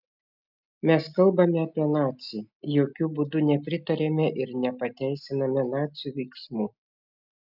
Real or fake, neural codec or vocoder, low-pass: real; none; 5.4 kHz